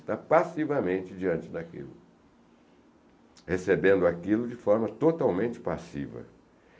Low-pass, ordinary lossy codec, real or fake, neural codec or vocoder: none; none; real; none